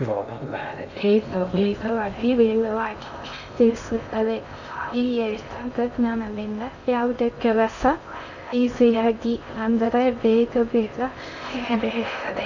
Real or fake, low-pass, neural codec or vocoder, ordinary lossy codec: fake; 7.2 kHz; codec, 16 kHz in and 24 kHz out, 0.6 kbps, FocalCodec, streaming, 2048 codes; none